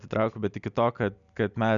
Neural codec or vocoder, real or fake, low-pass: none; real; 7.2 kHz